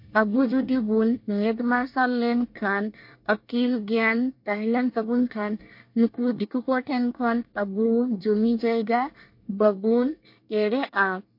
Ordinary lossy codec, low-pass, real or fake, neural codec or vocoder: MP3, 32 kbps; 5.4 kHz; fake; codec, 24 kHz, 1 kbps, SNAC